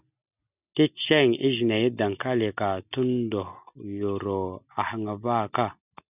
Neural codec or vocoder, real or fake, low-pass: none; real; 3.6 kHz